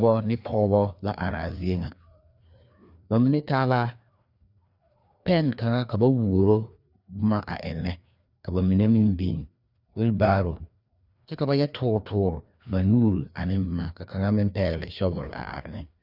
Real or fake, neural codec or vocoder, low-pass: fake; codec, 16 kHz, 2 kbps, FreqCodec, larger model; 5.4 kHz